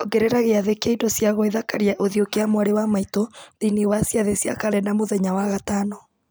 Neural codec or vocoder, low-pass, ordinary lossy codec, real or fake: none; none; none; real